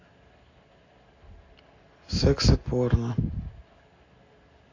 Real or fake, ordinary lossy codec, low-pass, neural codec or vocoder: real; AAC, 32 kbps; 7.2 kHz; none